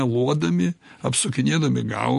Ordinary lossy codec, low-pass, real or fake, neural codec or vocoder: MP3, 48 kbps; 10.8 kHz; real; none